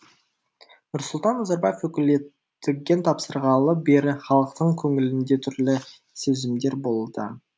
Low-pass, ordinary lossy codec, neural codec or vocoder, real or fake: none; none; none; real